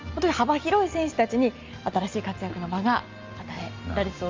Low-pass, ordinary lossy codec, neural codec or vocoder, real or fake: 7.2 kHz; Opus, 32 kbps; none; real